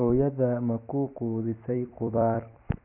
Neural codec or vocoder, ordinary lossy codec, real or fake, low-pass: vocoder, 44.1 kHz, 128 mel bands every 256 samples, BigVGAN v2; none; fake; 3.6 kHz